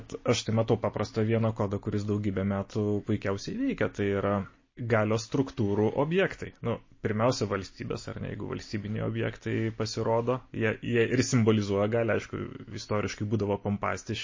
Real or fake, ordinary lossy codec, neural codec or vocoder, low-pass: real; MP3, 32 kbps; none; 7.2 kHz